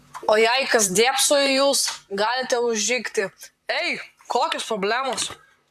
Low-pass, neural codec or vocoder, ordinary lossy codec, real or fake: 14.4 kHz; vocoder, 44.1 kHz, 128 mel bands, Pupu-Vocoder; AAC, 96 kbps; fake